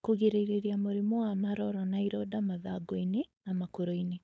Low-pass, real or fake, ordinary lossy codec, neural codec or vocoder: none; fake; none; codec, 16 kHz, 4.8 kbps, FACodec